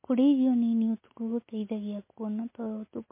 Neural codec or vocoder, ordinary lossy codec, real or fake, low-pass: none; MP3, 24 kbps; real; 3.6 kHz